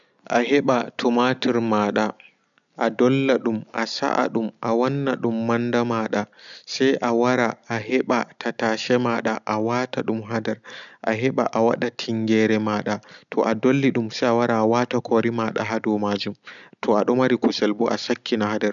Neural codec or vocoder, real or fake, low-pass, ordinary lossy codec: none; real; 7.2 kHz; none